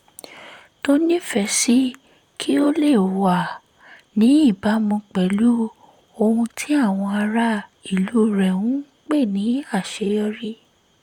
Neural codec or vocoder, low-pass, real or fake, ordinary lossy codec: vocoder, 44.1 kHz, 128 mel bands, Pupu-Vocoder; 19.8 kHz; fake; none